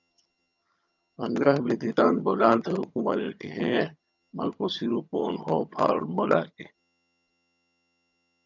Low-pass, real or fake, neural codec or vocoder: 7.2 kHz; fake; vocoder, 22.05 kHz, 80 mel bands, HiFi-GAN